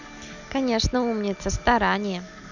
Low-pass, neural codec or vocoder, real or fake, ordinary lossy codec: 7.2 kHz; none; real; none